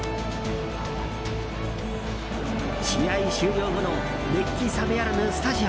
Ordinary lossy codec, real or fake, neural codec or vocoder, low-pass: none; real; none; none